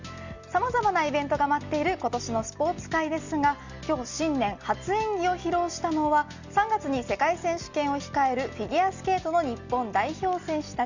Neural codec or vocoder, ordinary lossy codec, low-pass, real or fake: none; Opus, 64 kbps; 7.2 kHz; real